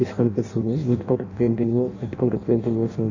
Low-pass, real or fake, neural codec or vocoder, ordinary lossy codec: 7.2 kHz; fake; codec, 16 kHz in and 24 kHz out, 0.6 kbps, FireRedTTS-2 codec; none